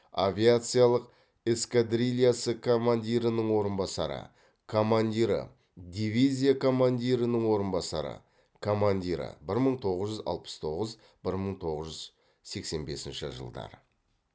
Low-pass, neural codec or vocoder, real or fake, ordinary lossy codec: none; none; real; none